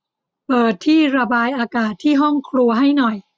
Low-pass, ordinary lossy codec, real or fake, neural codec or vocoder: none; none; real; none